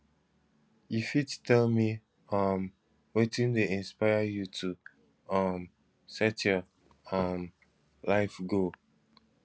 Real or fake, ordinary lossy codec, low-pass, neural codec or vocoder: real; none; none; none